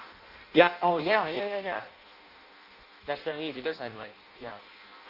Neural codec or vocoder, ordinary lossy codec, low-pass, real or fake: codec, 16 kHz in and 24 kHz out, 0.6 kbps, FireRedTTS-2 codec; Opus, 64 kbps; 5.4 kHz; fake